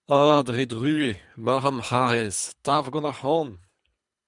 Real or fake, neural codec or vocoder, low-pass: fake; codec, 24 kHz, 3 kbps, HILCodec; 10.8 kHz